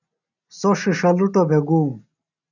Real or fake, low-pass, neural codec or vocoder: real; 7.2 kHz; none